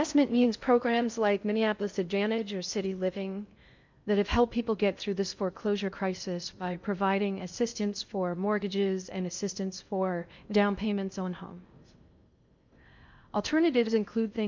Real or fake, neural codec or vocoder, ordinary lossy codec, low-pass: fake; codec, 16 kHz in and 24 kHz out, 0.6 kbps, FocalCodec, streaming, 4096 codes; MP3, 64 kbps; 7.2 kHz